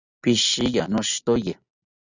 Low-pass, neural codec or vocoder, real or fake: 7.2 kHz; none; real